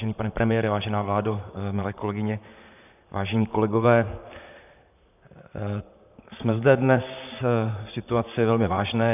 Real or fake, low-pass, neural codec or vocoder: fake; 3.6 kHz; vocoder, 44.1 kHz, 128 mel bands, Pupu-Vocoder